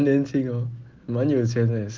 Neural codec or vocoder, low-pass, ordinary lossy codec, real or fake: none; 7.2 kHz; Opus, 16 kbps; real